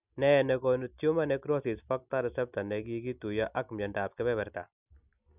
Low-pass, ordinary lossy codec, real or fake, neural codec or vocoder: 3.6 kHz; none; real; none